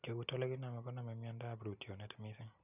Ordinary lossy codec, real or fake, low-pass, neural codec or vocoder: none; real; 3.6 kHz; none